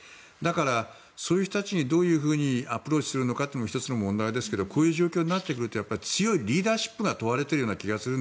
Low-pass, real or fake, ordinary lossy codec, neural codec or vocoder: none; real; none; none